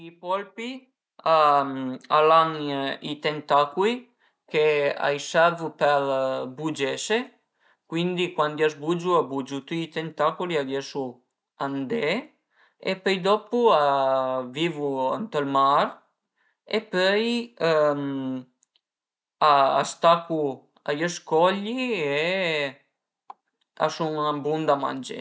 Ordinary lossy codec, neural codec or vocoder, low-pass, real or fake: none; none; none; real